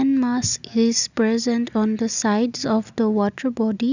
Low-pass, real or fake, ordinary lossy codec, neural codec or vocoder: 7.2 kHz; real; none; none